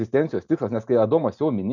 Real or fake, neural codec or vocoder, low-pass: real; none; 7.2 kHz